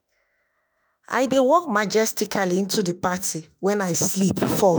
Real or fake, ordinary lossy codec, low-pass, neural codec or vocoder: fake; none; none; autoencoder, 48 kHz, 32 numbers a frame, DAC-VAE, trained on Japanese speech